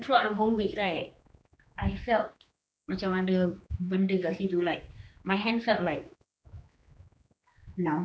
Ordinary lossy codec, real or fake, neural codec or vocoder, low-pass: none; fake; codec, 16 kHz, 2 kbps, X-Codec, HuBERT features, trained on general audio; none